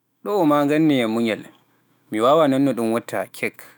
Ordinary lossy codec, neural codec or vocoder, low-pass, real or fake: none; autoencoder, 48 kHz, 128 numbers a frame, DAC-VAE, trained on Japanese speech; none; fake